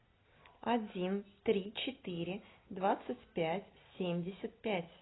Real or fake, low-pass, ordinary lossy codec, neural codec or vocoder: real; 7.2 kHz; AAC, 16 kbps; none